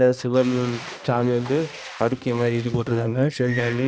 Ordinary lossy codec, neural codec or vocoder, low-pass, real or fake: none; codec, 16 kHz, 1 kbps, X-Codec, HuBERT features, trained on balanced general audio; none; fake